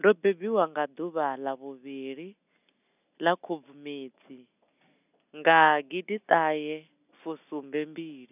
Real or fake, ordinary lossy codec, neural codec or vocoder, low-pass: real; none; none; 3.6 kHz